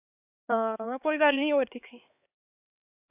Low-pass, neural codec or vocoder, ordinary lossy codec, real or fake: 3.6 kHz; codec, 16 kHz, 2 kbps, X-Codec, HuBERT features, trained on LibriSpeech; AAC, 32 kbps; fake